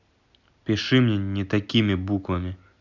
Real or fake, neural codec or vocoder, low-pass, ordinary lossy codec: real; none; 7.2 kHz; none